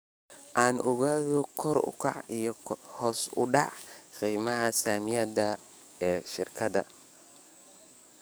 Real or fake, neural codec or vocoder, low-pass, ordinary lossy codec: fake; codec, 44.1 kHz, 7.8 kbps, DAC; none; none